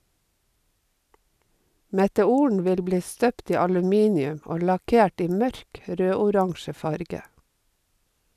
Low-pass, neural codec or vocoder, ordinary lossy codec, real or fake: 14.4 kHz; none; none; real